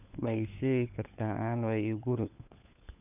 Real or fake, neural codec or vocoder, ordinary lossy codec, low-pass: fake; codec, 16 kHz, 4 kbps, FunCodec, trained on LibriTTS, 50 frames a second; none; 3.6 kHz